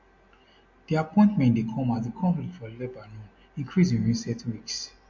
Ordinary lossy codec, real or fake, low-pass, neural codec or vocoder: MP3, 48 kbps; real; 7.2 kHz; none